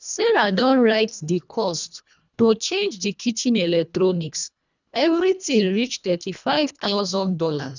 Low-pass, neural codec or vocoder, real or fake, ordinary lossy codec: 7.2 kHz; codec, 24 kHz, 1.5 kbps, HILCodec; fake; none